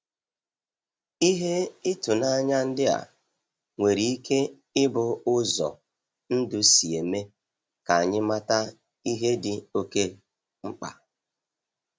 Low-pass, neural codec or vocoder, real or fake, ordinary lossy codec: none; none; real; none